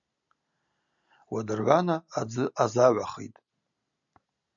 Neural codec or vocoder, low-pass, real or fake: none; 7.2 kHz; real